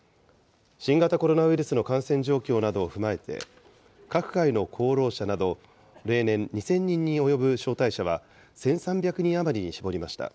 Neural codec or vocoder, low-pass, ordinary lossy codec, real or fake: none; none; none; real